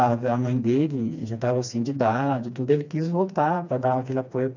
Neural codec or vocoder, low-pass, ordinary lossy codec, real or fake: codec, 16 kHz, 2 kbps, FreqCodec, smaller model; 7.2 kHz; none; fake